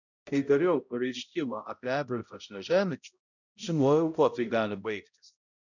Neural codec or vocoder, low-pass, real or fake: codec, 16 kHz, 0.5 kbps, X-Codec, HuBERT features, trained on balanced general audio; 7.2 kHz; fake